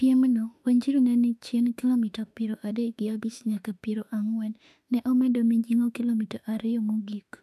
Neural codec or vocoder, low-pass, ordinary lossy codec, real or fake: autoencoder, 48 kHz, 32 numbers a frame, DAC-VAE, trained on Japanese speech; 14.4 kHz; none; fake